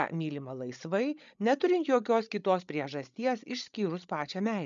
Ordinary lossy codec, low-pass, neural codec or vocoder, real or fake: MP3, 96 kbps; 7.2 kHz; codec, 16 kHz, 16 kbps, FreqCodec, larger model; fake